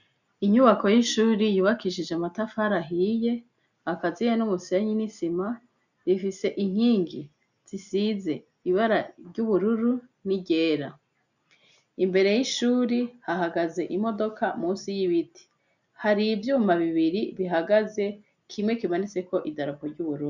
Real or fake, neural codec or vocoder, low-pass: real; none; 7.2 kHz